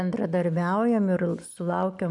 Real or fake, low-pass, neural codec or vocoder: real; 10.8 kHz; none